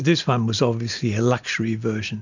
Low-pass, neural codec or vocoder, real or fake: 7.2 kHz; none; real